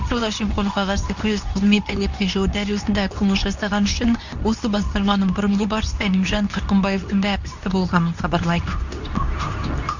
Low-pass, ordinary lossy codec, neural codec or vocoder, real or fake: 7.2 kHz; none; codec, 24 kHz, 0.9 kbps, WavTokenizer, medium speech release version 2; fake